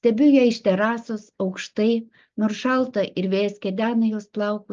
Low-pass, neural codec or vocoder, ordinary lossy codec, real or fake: 7.2 kHz; none; Opus, 24 kbps; real